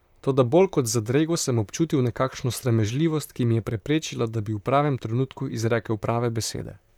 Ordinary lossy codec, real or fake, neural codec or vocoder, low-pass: none; fake; vocoder, 44.1 kHz, 128 mel bands, Pupu-Vocoder; 19.8 kHz